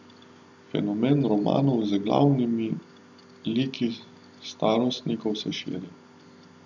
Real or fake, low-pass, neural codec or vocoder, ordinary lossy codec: real; 7.2 kHz; none; none